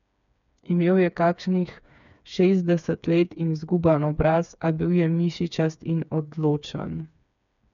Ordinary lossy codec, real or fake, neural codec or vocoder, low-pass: none; fake; codec, 16 kHz, 4 kbps, FreqCodec, smaller model; 7.2 kHz